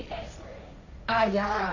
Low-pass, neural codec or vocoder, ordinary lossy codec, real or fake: 7.2 kHz; codec, 16 kHz, 1.1 kbps, Voila-Tokenizer; none; fake